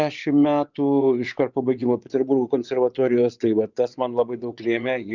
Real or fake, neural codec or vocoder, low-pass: fake; vocoder, 24 kHz, 100 mel bands, Vocos; 7.2 kHz